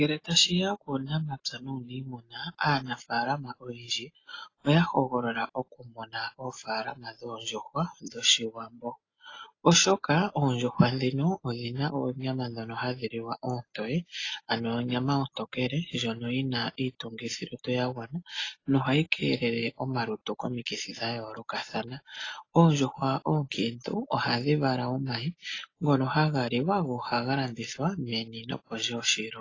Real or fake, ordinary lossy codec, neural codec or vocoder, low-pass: real; AAC, 32 kbps; none; 7.2 kHz